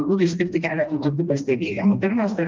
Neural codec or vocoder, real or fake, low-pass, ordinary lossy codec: codec, 16 kHz, 1 kbps, FreqCodec, smaller model; fake; 7.2 kHz; Opus, 24 kbps